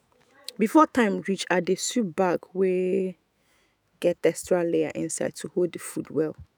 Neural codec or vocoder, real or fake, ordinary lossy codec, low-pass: autoencoder, 48 kHz, 128 numbers a frame, DAC-VAE, trained on Japanese speech; fake; none; none